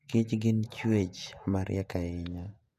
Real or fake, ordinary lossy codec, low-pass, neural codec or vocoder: fake; none; 14.4 kHz; vocoder, 48 kHz, 128 mel bands, Vocos